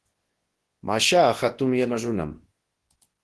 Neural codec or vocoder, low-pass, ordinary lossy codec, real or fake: codec, 24 kHz, 0.9 kbps, WavTokenizer, large speech release; 10.8 kHz; Opus, 16 kbps; fake